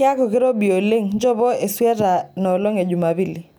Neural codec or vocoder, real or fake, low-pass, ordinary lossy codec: none; real; none; none